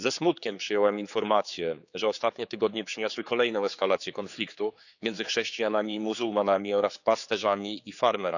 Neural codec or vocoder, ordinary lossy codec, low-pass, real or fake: codec, 16 kHz, 4 kbps, X-Codec, HuBERT features, trained on general audio; none; 7.2 kHz; fake